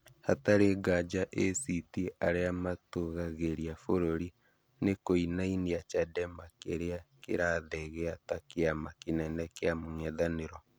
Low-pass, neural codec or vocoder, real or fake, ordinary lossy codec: none; none; real; none